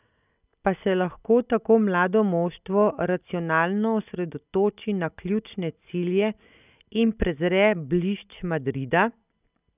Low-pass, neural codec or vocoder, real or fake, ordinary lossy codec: 3.6 kHz; none; real; none